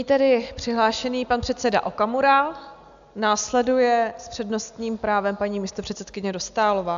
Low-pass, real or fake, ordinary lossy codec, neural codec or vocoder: 7.2 kHz; real; AAC, 96 kbps; none